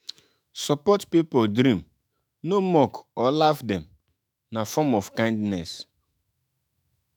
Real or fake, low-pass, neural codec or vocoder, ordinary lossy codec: fake; none; autoencoder, 48 kHz, 128 numbers a frame, DAC-VAE, trained on Japanese speech; none